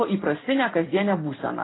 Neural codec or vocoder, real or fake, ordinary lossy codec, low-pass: none; real; AAC, 16 kbps; 7.2 kHz